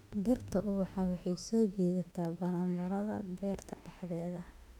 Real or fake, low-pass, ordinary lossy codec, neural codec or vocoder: fake; 19.8 kHz; none; autoencoder, 48 kHz, 32 numbers a frame, DAC-VAE, trained on Japanese speech